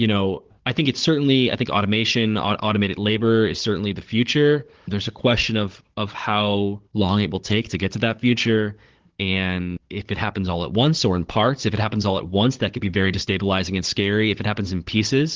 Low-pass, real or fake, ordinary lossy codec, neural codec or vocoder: 7.2 kHz; fake; Opus, 16 kbps; codec, 16 kHz, 16 kbps, FunCodec, trained on Chinese and English, 50 frames a second